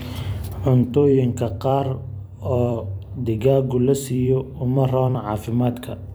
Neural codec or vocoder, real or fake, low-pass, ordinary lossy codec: vocoder, 44.1 kHz, 128 mel bands every 256 samples, BigVGAN v2; fake; none; none